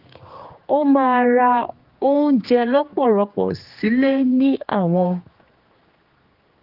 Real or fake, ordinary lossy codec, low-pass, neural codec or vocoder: fake; Opus, 32 kbps; 5.4 kHz; codec, 16 kHz, 2 kbps, X-Codec, HuBERT features, trained on general audio